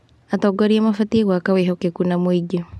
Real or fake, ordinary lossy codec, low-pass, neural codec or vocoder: real; none; none; none